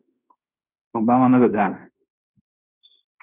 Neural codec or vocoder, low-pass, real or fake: codec, 16 kHz in and 24 kHz out, 0.9 kbps, LongCat-Audio-Codec, fine tuned four codebook decoder; 3.6 kHz; fake